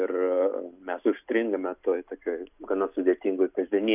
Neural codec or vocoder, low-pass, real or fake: none; 3.6 kHz; real